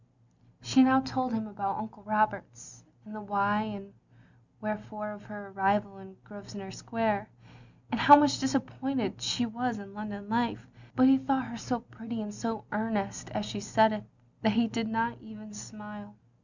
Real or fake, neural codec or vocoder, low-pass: real; none; 7.2 kHz